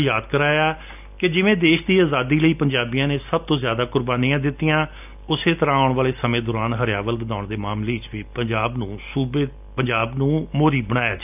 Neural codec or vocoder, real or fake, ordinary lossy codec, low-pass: none; real; none; 3.6 kHz